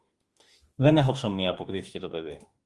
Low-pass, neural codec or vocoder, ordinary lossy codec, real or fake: 10.8 kHz; autoencoder, 48 kHz, 32 numbers a frame, DAC-VAE, trained on Japanese speech; Opus, 24 kbps; fake